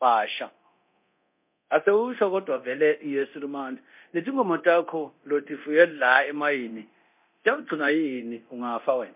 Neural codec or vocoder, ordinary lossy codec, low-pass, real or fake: codec, 24 kHz, 0.9 kbps, DualCodec; MP3, 32 kbps; 3.6 kHz; fake